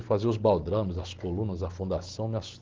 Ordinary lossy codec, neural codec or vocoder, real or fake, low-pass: Opus, 16 kbps; none; real; 7.2 kHz